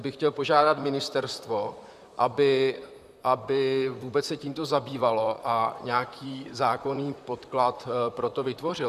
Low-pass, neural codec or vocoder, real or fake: 14.4 kHz; vocoder, 44.1 kHz, 128 mel bands, Pupu-Vocoder; fake